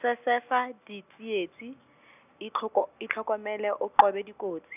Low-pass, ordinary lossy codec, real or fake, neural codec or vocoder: 3.6 kHz; none; real; none